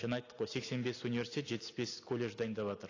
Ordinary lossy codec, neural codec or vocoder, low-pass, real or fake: AAC, 32 kbps; none; 7.2 kHz; real